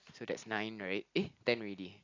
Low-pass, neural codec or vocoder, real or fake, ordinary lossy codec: 7.2 kHz; none; real; AAC, 48 kbps